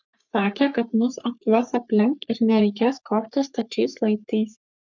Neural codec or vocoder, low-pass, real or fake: codec, 44.1 kHz, 3.4 kbps, Pupu-Codec; 7.2 kHz; fake